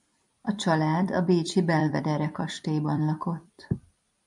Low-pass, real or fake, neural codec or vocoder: 10.8 kHz; fake; vocoder, 44.1 kHz, 128 mel bands every 256 samples, BigVGAN v2